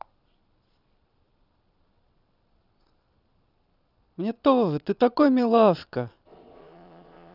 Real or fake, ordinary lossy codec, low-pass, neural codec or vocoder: fake; none; 5.4 kHz; vocoder, 22.05 kHz, 80 mel bands, Vocos